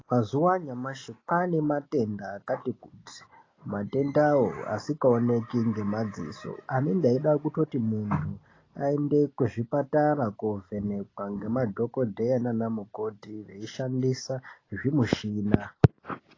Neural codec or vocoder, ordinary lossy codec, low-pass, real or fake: none; AAC, 32 kbps; 7.2 kHz; real